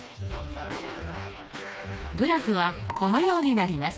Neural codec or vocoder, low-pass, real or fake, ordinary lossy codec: codec, 16 kHz, 2 kbps, FreqCodec, smaller model; none; fake; none